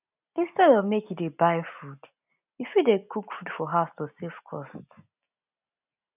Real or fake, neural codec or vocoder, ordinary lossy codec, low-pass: real; none; none; 3.6 kHz